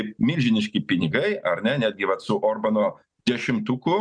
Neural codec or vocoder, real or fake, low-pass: none; real; 9.9 kHz